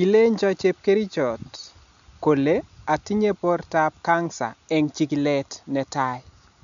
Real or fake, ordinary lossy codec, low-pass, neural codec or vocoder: real; none; 7.2 kHz; none